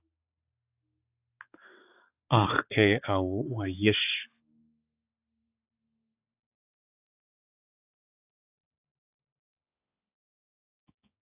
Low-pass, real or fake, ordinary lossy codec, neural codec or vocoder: 3.6 kHz; fake; AAC, 32 kbps; codec, 16 kHz, 4 kbps, X-Codec, HuBERT features, trained on balanced general audio